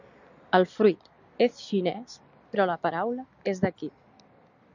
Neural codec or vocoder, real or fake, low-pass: none; real; 7.2 kHz